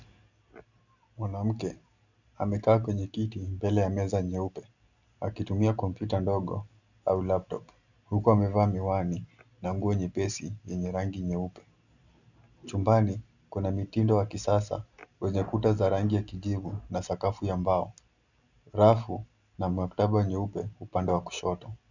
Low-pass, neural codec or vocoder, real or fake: 7.2 kHz; none; real